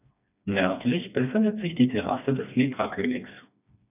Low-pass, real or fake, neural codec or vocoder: 3.6 kHz; fake; codec, 16 kHz, 2 kbps, FreqCodec, smaller model